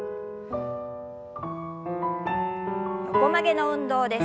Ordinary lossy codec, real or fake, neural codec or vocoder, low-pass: none; real; none; none